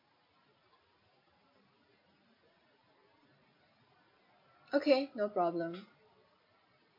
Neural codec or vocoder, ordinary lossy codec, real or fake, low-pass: none; MP3, 32 kbps; real; 5.4 kHz